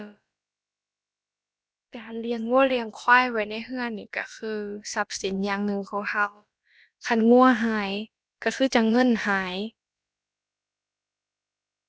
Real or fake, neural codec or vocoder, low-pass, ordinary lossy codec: fake; codec, 16 kHz, about 1 kbps, DyCAST, with the encoder's durations; none; none